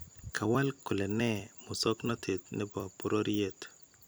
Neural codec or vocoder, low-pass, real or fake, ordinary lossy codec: vocoder, 44.1 kHz, 128 mel bands every 256 samples, BigVGAN v2; none; fake; none